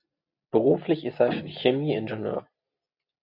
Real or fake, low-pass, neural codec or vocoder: real; 5.4 kHz; none